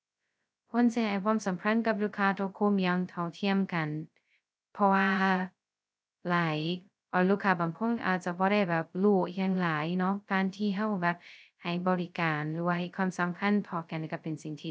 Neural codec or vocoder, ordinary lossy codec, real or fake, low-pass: codec, 16 kHz, 0.2 kbps, FocalCodec; none; fake; none